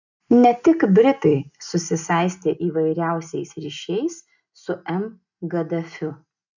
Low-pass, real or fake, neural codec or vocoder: 7.2 kHz; real; none